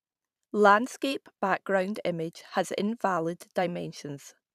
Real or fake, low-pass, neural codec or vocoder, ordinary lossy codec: fake; 14.4 kHz; vocoder, 44.1 kHz, 128 mel bands every 512 samples, BigVGAN v2; none